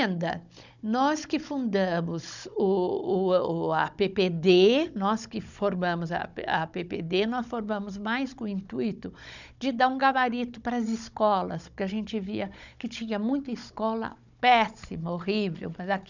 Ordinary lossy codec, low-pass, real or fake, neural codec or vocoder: none; 7.2 kHz; fake; codec, 16 kHz, 16 kbps, FunCodec, trained on Chinese and English, 50 frames a second